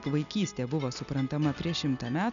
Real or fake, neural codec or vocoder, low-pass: real; none; 7.2 kHz